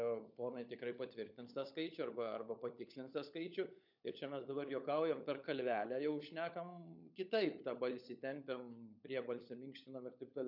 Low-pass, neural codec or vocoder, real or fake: 5.4 kHz; codec, 16 kHz, 16 kbps, FunCodec, trained on LibriTTS, 50 frames a second; fake